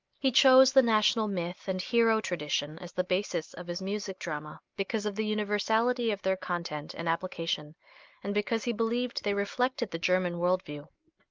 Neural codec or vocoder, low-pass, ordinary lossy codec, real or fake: none; 7.2 kHz; Opus, 24 kbps; real